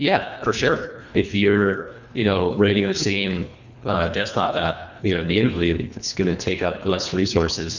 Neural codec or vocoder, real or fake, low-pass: codec, 24 kHz, 1.5 kbps, HILCodec; fake; 7.2 kHz